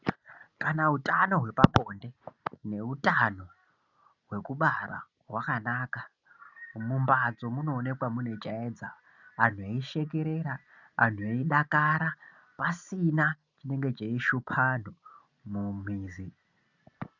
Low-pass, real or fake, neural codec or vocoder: 7.2 kHz; real; none